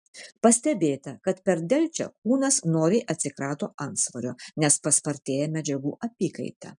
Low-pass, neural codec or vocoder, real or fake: 10.8 kHz; none; real